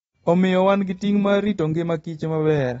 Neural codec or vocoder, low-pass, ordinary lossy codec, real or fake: none; 19.8 kHz; AAC, 24 kbps; real